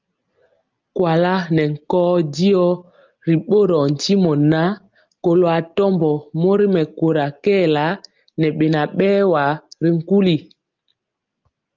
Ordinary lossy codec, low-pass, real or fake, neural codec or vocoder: Opus, 24 kbps; 7.2 kHz; real; none